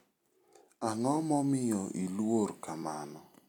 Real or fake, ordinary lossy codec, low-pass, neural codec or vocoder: real; none; 19.8 kHz; none